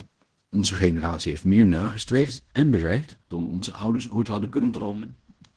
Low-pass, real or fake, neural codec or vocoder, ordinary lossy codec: 10.8 kHz; fake; codec, 16 kHz in and 24 kHz out, 0.9 kbps, LongCat-Audio-Codec, fine tuned four codebook decoder; Opus, 16 kbps